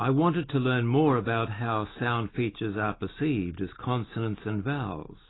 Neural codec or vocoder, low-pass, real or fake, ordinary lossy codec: none; 7.2 kHz; real; AAC, 16 kbps